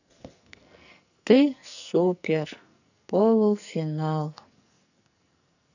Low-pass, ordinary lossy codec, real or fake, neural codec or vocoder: 7.2 kHz; none; fake; codec, 44.1 kHz, 2.6 kbps, SNAC